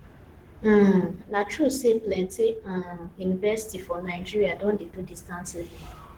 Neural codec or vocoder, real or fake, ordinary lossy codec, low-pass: codec, 44.1 kHz, 7.8 kbps, Pupu-Codec; fake; Opus, 16 kbps; 19.8 kHz